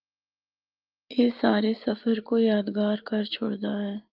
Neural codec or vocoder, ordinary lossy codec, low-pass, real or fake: none; Opus, 24 kbps; 5.4 kHz; real